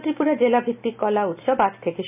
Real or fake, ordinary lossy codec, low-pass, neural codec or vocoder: real; none; 3.6 kHz; none